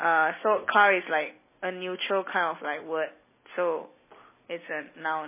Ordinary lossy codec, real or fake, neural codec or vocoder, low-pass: MP3, 16 kbps; real; none; 3.6 kHz